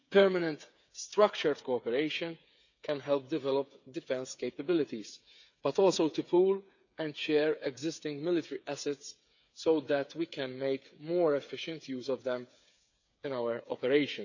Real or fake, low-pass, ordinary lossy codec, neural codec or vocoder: fake; 7.2 kHz; none; codec, 16 kHz, 8 kbps, FreqCodec, smaller model